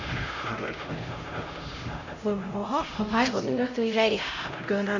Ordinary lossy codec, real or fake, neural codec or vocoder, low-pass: none; fake; codec, 16 kHz, 0.5 kbps, X-Codec, HuBERT features, trained on LibriSpeech; 7.2 kHz